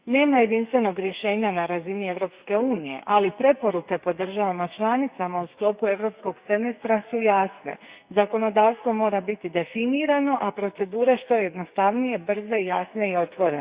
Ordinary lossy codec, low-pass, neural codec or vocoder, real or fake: Opus, 64 kbps; 3.6 kHz; codec, 44.1 kHz, 2.6 kbps, SNAC; fake